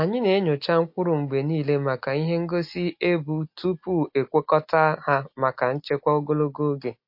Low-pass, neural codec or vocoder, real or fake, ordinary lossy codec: 5.4 kHz; none; real; MP3, 32 kbps